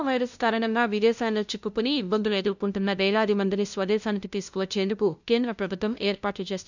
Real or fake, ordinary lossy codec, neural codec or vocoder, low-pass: fake; none; codec, 16 kHz, 0.5 kbps, FunCodec, trained on LibriTTS, 25 frames a second; 7.2 kHz